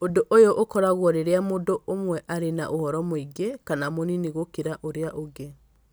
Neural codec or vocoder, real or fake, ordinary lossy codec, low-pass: none; real; none; none